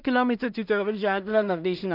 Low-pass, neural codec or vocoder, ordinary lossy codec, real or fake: 5.4 kHz; codec, 16 kHz in and 24 kHz out, 0.4 kbps, LongCat-Audio-Codec, two codebook decoder; none; fake